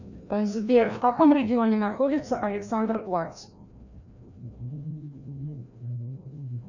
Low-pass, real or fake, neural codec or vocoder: 7.2 kHz; fake; codec, 16 kHz, 1 kbps, FreqCodec, larger model